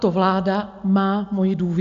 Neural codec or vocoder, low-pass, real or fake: none; 7.2 kHz; real